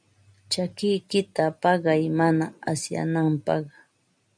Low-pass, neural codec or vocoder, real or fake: 9.9 kHz; none; real